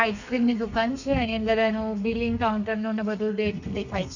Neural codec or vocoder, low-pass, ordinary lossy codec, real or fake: codec, 32 kHz, 1.9 kbps, SNAC; 7.2 kHz; none; fake